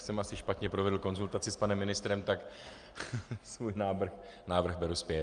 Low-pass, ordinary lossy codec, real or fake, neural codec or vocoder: 9.9 kHz; Opus, 32 kbps; real; none